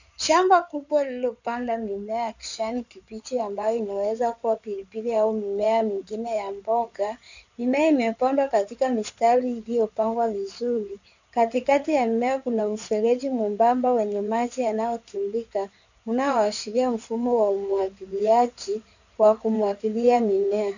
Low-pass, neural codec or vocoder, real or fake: 7.2 kHz; codec, 16 kHz in and 24 kHz out, 2.2 kbps, FireRedTTS-2 codec; fake